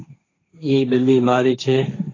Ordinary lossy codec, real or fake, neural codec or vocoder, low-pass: AAC, 32 kbps; fake; codec, 16 kHz, 1.1 kbps, Voila-Tokenizer; 7.2 kHz